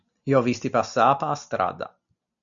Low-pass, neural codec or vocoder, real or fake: 7.2 kHz; none; real